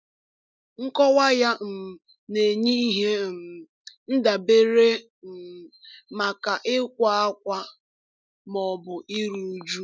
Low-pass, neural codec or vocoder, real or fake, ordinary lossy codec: none; none; real; none